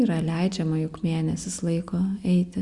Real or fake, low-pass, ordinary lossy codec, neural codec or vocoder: real; 10.8 kHz; Opus, 64 kbps; none